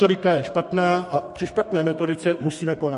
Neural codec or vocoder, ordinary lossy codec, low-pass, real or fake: codec, 32 kHz, 1.9 kbps, SNAC; MP3, 48 kbps; 14.4 kHz; fake